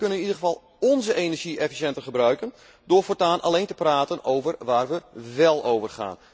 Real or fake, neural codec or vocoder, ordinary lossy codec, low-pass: real; none; none; none